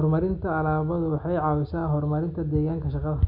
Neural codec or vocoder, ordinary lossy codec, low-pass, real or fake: none; none; 5.4 kHz; real